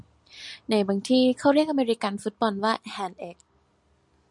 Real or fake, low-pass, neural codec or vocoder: real; 10.8 kHz; none